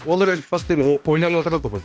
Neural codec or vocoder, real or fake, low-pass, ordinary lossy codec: codec, 16 kHz, 1 kbps, X-Codec, HuBERT features, trained on balanced general audio; fake; none; none